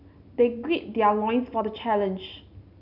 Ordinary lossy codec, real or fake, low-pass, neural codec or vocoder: none; real; 5.4 kHz; none